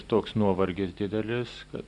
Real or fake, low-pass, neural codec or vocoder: real; 10.8 kHz; none